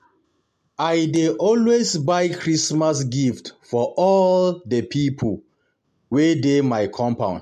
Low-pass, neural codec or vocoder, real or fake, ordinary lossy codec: 14.4 kHz; none; real; MP3, 64 kbps